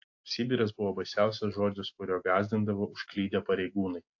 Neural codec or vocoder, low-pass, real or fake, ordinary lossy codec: none; 7.2 kHz; real; AAC, 48 kbps